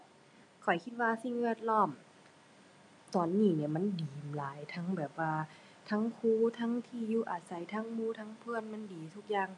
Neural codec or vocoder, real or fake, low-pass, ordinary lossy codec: none; real; 10.8 kHz; none